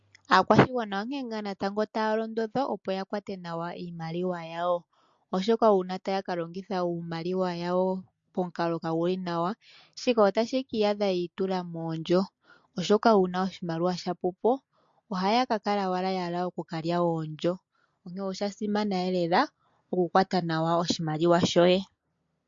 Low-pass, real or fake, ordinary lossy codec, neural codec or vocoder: 7.2 kHz; real; MP3, 48 kbps; none